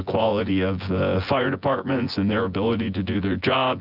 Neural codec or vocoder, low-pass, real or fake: vocoder, 24 kHz, 100 mel bands, Vocos; 5.4 kHz; fake